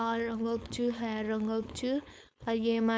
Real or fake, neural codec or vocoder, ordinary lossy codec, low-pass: fake; codec, 16 kHz, 4.8 kbps, FACodec; none; none